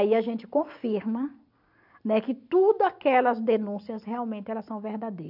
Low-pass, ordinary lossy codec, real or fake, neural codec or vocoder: 5.4 kHz; none; real; none